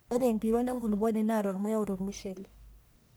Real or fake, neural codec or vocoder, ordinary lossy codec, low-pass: fake; codec, 44.1 kHz, 1.7 kbps, Pupu-Codec; none; none